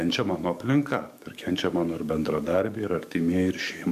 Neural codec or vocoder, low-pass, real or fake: codec, 44.1 kHz, 7.8 kbps, DAC; 14.4 kHz; fake